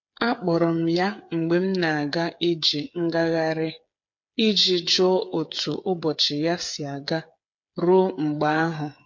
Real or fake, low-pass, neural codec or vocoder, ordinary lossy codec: fake; 7.2 kHz; codec, 16 kHz, 8 kbps, FreqCodec, smaller model; MP3, 48 kbps